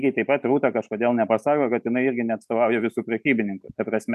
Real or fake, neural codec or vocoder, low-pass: real; none; 14.4 kHz